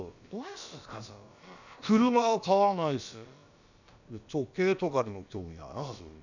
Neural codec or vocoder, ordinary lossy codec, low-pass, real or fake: codec, 16 kHz, about 1 kbps, DyCAST, with the encoder's durations; none; 7.2 kHz; fake